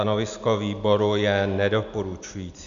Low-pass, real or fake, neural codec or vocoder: 7.2 kHz; real; none